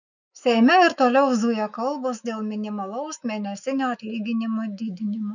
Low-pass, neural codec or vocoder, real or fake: 7.2 kHz; autoencoder, 48 kHz, 128 numbers a frame, DAC-VAE, trained on Japanese speech; fake